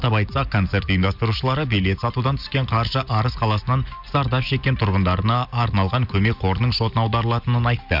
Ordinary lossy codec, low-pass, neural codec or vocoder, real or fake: none; 5.4 kHz; none; real